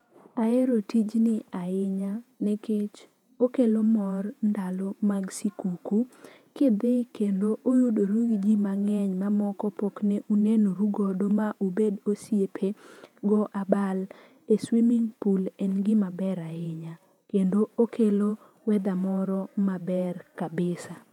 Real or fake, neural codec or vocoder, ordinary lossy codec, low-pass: fake; vocoder, 48 kHz, 128 mel bands, Vocos; none; 19.8 kHz